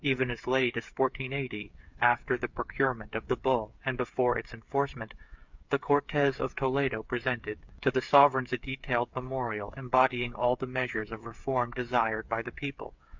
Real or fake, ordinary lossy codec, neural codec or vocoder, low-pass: fake; MP3, 64 kbps; codec, 16 kHz, 8 kbps, FreqCodec, smaller model; 7.2 kHz